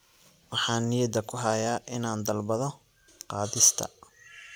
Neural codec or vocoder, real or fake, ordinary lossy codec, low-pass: none; real; none; none